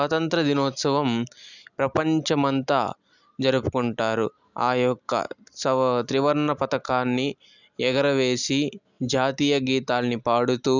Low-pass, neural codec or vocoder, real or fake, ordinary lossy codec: 7.2 kHz; none; real; none